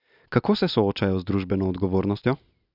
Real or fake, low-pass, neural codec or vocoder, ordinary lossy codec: real; 5.4 kHz; none; none